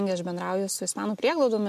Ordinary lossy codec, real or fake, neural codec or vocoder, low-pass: MP3, 64 kbps; real; none; 14.4 kHz